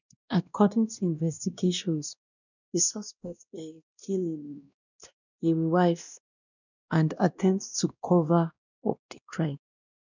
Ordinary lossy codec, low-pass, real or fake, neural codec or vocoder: none; 7.2 kHz; fake; codec, 16 kHz, 1 kbps, X-Codec, WavLM features, trained on Multilingual LibriSpeech